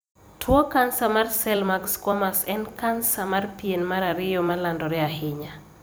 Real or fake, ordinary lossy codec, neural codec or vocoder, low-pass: fake; none; vocoder, 44.1 kHz, 128 mel bands every 256 samples, BigVGAN v2; none